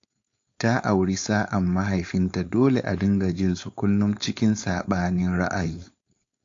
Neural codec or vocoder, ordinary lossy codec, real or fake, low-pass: codec, 16 kHz, 4.8 kbps, FACodec; AAC, 48 kbps; fake; 7.2 kHz